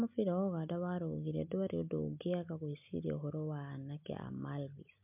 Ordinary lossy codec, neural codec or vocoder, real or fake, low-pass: MP3, 32 kbps; none; real; 3.6 kHz